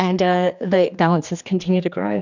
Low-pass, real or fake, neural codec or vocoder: 7.2 kHz; fake; codec, 16 kHz, 2 kbps, X-Codec, HuBERT features, trained on general audio